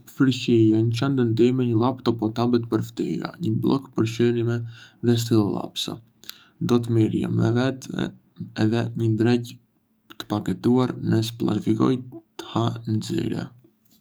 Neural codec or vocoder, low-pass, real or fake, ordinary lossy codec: codec, 44.1 kHz, 7.8 kbps, DAC; none; fake; none